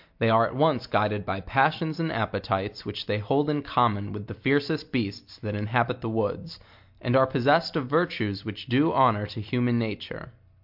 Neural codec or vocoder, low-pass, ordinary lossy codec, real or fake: none; 5.4 kHz; MP3, 48 kbps; real